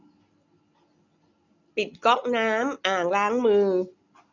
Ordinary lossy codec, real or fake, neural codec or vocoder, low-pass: none; real; none; 7.2 kHz